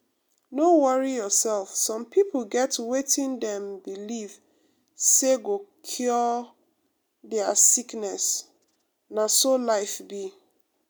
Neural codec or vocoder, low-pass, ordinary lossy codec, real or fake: none; none; none; real